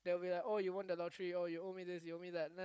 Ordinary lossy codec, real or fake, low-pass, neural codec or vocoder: none; fake; none; codec, 16 kHz, 16 kbps, FunCodec, trained on Chinese and English, 50 frames a second